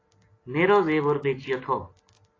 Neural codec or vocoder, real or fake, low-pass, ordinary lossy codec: none; real; 7.2 kHz; AAC, 32 kbps